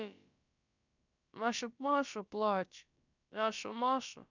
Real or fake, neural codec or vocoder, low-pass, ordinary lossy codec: fake; codec, 16 kHz, about 1 kbps, DyCAST, with the encoder's durations; 7.2 kHz; none